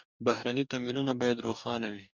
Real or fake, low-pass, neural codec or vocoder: fake; 7.2 kHz; codec, 44.1 kHz, 2.6 kbps, DAC